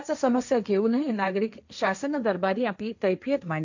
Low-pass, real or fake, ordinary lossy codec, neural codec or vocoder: 7.2 kHz; fake; none; codec, 16 kHz, 1.1 kbps, Voila-Tokenizer